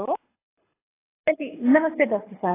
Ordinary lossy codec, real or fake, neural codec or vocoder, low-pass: AAC, 16 kbps; fake; codec, 24 kHz, 6 kbps, HILCodec; 3.6 kHz